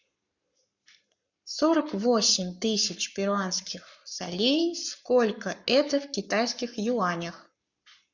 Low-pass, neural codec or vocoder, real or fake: 7.2 kHz; codec, 44.1 kHz, 7.8 kbps, Pupu-Codec; fake